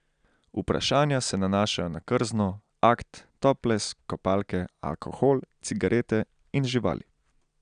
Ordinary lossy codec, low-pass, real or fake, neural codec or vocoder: none; 9.9 kHz; real; none